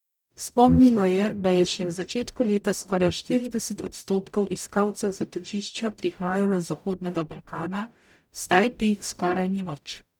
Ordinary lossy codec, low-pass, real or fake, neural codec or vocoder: none; 19.8 kHz; fake; codec, 44.1 kHz, 0.9 kbps, DAC